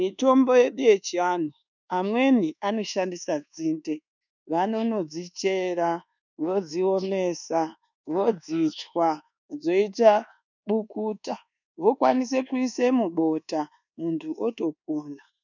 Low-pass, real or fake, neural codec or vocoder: 7.2 kHz; fake; codec, 24 kHz, 1.2 kbps, DualCodec